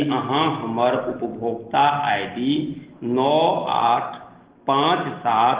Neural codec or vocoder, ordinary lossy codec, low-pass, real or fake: none; Opus, 16 kbps; 3.6 kHz; real